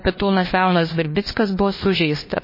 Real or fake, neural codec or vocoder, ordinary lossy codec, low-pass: fake; codec, 16 kHz, 1 kbps, FunCodec, trained on LibriTTS, 50 frames a second; MP3, 24 kbps; 5.4 kHz